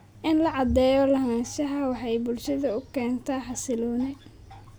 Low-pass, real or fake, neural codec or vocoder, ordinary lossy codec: none; real; none; none